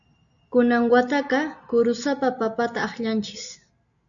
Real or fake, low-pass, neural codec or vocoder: real; 7.2 kHz; none